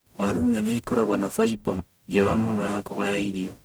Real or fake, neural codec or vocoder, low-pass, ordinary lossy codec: fake; codec, 44.1 kHz, 0.9 kbps, DAC; none; none